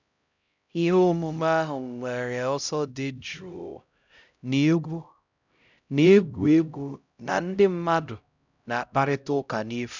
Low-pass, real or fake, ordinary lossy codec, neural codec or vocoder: 7.2 kHz; fake; none; codec, 16 kHz, 0.5 kbps, X-Codec, HuBERT features, trained on LibriSpeech